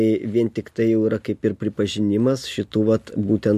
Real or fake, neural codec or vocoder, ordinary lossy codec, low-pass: real; none; MP3, 64 kbps; 14.4 kHz